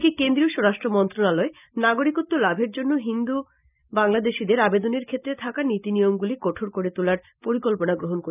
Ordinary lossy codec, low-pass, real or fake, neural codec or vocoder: none; 3.6 kHz; real; none